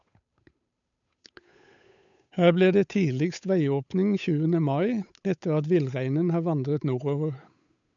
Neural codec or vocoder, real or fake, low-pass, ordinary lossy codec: codec, 16 kHz, 8 kbps, FunCodec, trained on Chinese and English, 25 frames a second; fake; 7.2 kHz; none